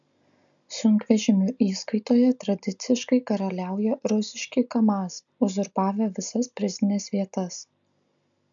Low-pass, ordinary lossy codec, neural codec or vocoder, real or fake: 7.2 kHz; MP3, 96 kbps; none; real